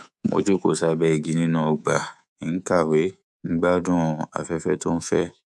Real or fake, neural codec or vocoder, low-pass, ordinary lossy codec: fake; codec, 24 kHz, 3.1 kbps, DualCodec; none; none